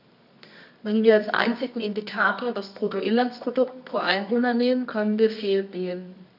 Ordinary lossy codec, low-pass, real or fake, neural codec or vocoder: none; 5.4 kHz; fake; codec, 24 kHz, 0.9 kbps, WavTokenizer, medium music audio release